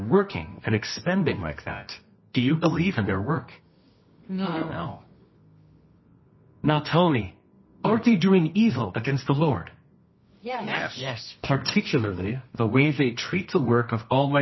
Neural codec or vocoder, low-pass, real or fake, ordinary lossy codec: codec, 24 kHz, 0.9 kbps, WavTokenizer, medium music audio release; 7.2 kHz; fake; MP3, 24 kbps